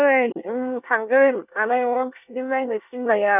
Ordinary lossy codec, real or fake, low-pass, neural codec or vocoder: none; fake; 3.6 kHz; codec, 24 kHz, 1 kbps, SNAC